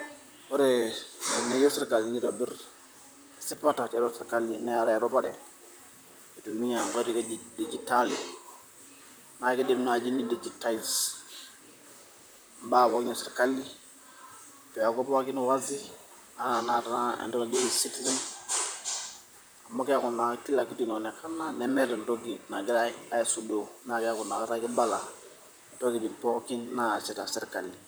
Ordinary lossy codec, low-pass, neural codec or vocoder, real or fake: none; none; vocoder, 44.1 kHz, 128 mel bands, Pupu-Vocoder; fake